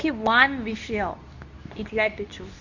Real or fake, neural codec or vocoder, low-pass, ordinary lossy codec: fake; codec, 24 kHz, 0.9 kbps, WavTokenizer, medium speech release version 2; 7.2 kHz; none